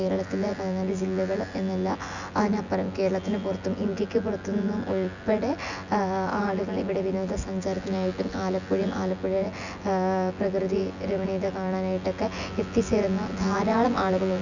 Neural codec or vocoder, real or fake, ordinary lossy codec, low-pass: vocoder, 24 kHz, 100 mel bands, Vocos; fake; none; 7.2 kHz